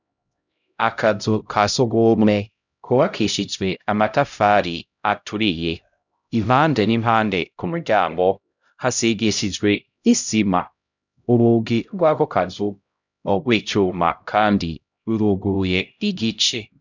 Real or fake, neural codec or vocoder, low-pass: fake; codec, 16 kHz, 0.5 kbps, X-Codec, HuBERT features, trained on LibriSpeech; 7.2 kHz